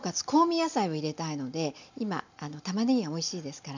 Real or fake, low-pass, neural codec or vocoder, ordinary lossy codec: real; 7.2 kHz; none; none